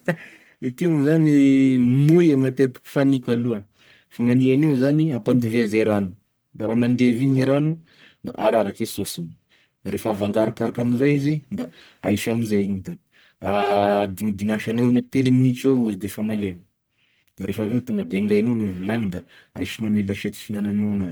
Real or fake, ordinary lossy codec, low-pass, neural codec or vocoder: fake; none; none; codec, 44.1 kHz, 1.7 kbps, Pupu-Codec